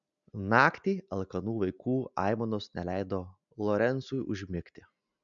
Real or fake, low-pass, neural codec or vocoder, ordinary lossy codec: real; 7.2 kHz; none; MP3, 96 kbps